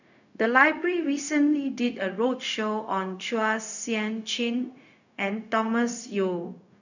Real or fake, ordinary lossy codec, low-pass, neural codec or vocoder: fake; none; 7.2 kHz; codec, 16 kHz, 0.4 kbps, LongCat-Audio-Codec